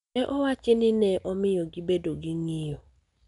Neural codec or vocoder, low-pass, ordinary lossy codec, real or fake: none; 10.8 kHz; none; real